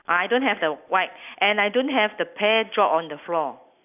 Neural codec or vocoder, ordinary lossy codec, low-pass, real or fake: none; none; 3.6 kHz; real